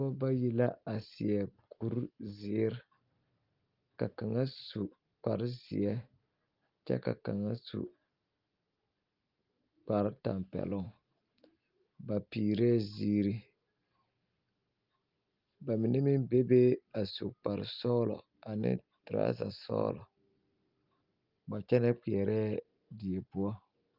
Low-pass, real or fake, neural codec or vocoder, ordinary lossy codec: 5.4 kHz; real; none; Opus, 24 kbps